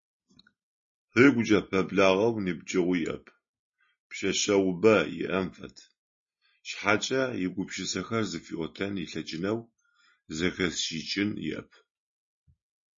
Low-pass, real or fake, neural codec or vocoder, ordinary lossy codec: 7.2 kHz; real; none; MP3, 32 kbps